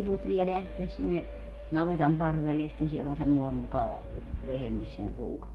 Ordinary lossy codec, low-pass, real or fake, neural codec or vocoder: Opus, 24 kbps; 19.8 kHz; fake; codec, 44.1 kHz, 2.6 kbps, DAC